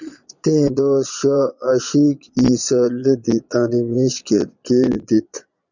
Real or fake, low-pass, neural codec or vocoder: fake; 7.2 kHz; vocoder, 22.05 kHz, 80 mel bands, Vocos